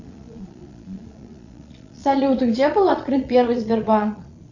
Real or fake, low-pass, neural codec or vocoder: fake; 7.2 kHz; vocoder, 22.05 kHz, 80 mel bands, Vocos